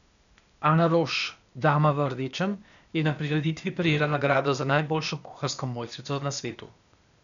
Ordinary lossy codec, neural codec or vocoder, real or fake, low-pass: none; codec, 16 kHz, 0.8 kbps, ZipCodec; fake; 7.2 kHz